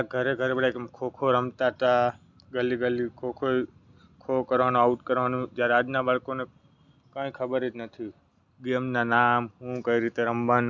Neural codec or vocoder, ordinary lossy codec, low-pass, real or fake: none; none; 7.2 kHz; real